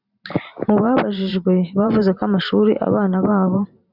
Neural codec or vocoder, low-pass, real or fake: vocoder, 44.1 kHz, 128 mel bands every 512 samples, BigVGAN v2; 5.4 kHz; fake